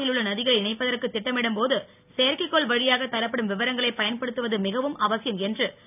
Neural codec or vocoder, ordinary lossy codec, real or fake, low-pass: none; none; real; 3.6 kHz